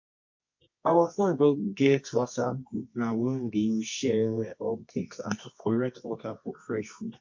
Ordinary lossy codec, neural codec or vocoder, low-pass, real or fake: MP3, 48 kbps; codec, 24 kHz, 0.9 kbps, WavTokenizer, medium music audio release; 7.2 kHz; fake